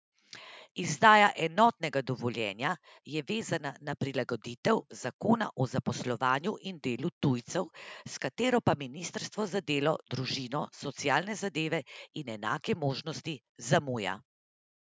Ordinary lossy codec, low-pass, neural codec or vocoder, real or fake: none; none; none; real